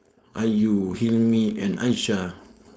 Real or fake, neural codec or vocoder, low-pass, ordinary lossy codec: fake; codec, 16 kHz, 4.8 kbps, FACodec; none; none